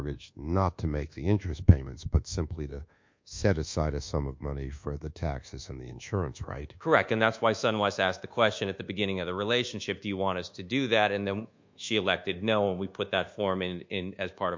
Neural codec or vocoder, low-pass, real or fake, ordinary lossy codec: codec, 24 kHz, 1.2 kbps, DualCodec; 7.2 kHz; fake; MP3, 48 kbps